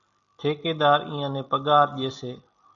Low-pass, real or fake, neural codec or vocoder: 7.2 kHz; real; none